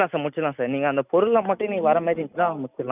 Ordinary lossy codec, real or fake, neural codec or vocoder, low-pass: none; real; none; 3.6 kHz